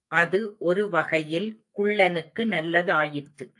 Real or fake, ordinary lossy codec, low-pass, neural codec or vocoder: fake; AAC, 64 kbps; 10.8 kHz; codec, 44.1 kHz, 2.6 kbps, SNAC